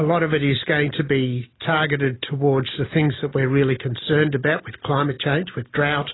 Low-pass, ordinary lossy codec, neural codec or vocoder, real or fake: 7.2 kHz; AAC, 16 kbps; none; real